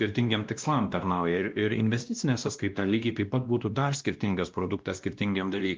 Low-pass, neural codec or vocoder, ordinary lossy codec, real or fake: 7.2 kHz; codec, 16 kHz, 1 kbps, X-Codec, WavLM features, trained on Multilingual LibriSpeech; Opus, 24 kbps; fake